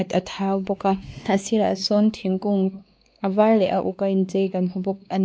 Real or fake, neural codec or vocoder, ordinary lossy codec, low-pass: fake; codec, 16 kHz, 2 kbps, X-Codec, WavLM features, trained on Multilingual LibriSpeech; none; none